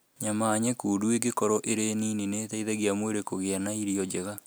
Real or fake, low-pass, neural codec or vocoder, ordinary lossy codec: real; none; none; none